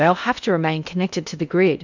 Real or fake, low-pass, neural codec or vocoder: fake; 7.2 kHz; codec, 16 kHz in and 24 kHz out, 0.6 kbps, FocalCodec, streaming, 4096 codes